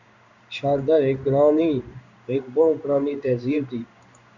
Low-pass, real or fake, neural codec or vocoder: 7.2 kHz; fake; codec, 16 kHz in and 24 kHz out, 1 kbps, XY-Tokenizer